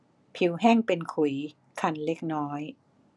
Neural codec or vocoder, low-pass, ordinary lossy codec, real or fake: none; 10.8 kHz; none; real